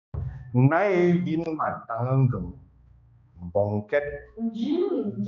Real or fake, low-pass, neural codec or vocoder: fake; 7.2 kHz; codec, 16 kHz, 2 kbps, X-Codec, HuBERT features, trained on balanced general audio